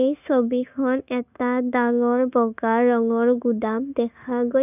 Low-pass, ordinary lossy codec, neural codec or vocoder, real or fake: 3.6 kHz; none; codec, 16 kHz, 0.9 kbps, LongCat-Audio-Codec; fake